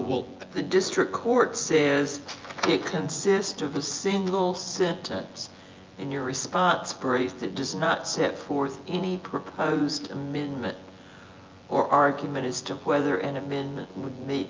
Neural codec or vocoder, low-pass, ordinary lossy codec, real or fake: vocoder, 24 kHz, 100 mel bands, Vocos; 7.2 kHz; Opus, 24 kbps; fake